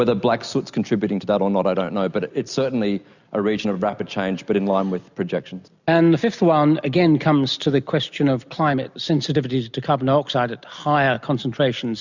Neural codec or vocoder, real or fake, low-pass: none; real; 7.2 kHz